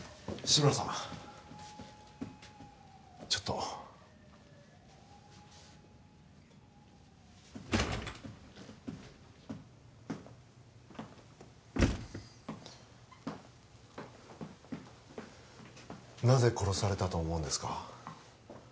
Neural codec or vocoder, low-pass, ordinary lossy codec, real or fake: none; none; none; real